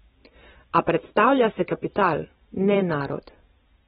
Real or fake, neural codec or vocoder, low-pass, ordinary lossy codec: fake; vocoder, 44.1 kHz, 128 mel bands every 256 samples, BigVGAN v2; 19.8 kHz; AAC, 16 kbps